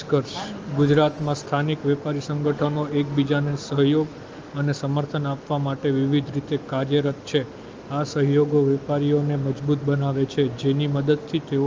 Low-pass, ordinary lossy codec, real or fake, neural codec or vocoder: 7.2 kHz; Opus, 24 kbps; real; none